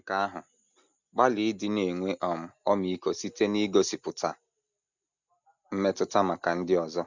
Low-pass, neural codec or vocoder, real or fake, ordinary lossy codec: 7.2 kHz; none; real; none